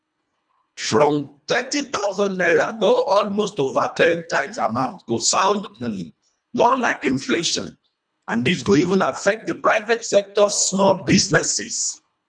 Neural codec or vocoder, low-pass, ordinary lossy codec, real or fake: codec, 24 kHz, 1.5 kbps, HILCodec; 9.9 kHz; none; fake